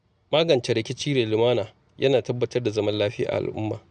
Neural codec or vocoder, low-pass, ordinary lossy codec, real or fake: none; 9.9 kHz; none; real